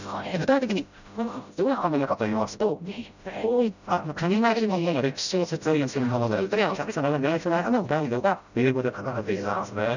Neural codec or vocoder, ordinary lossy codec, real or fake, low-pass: codec, 16 kHz, 0.5 kbps, FreqCodec, smaller model; none; fake; 7.2 kHz